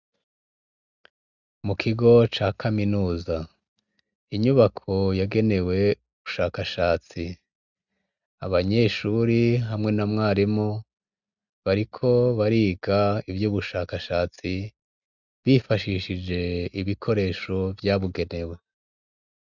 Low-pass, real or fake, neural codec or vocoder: 7.2 kHz; real; none